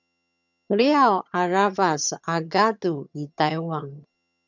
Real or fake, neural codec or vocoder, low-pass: fake; vocoder, 22.05 kHz, 80 mel bands, HiFi-GAN; 7.2 kHz